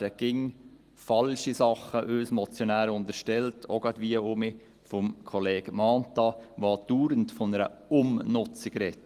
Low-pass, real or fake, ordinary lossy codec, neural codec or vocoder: 14.4 kHz; real; Opus, 24 kbps; none